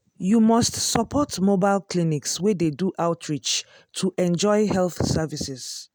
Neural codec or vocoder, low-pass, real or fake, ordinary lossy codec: none; none; real; none